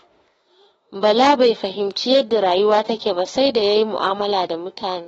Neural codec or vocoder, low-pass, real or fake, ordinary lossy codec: autoencoder, 48 kHz, 32 numbers a frame, DAC-VAE, trained on Japanese speech; 19.8 kHz; fake; AAC, 24 kbps